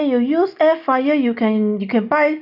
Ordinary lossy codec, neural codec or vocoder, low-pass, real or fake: none; none; 5.4 kHz; real